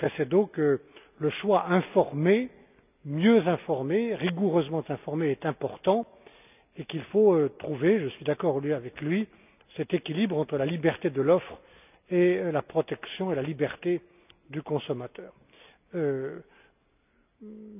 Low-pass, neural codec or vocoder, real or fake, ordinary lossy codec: 3.6 kHz; none; real; none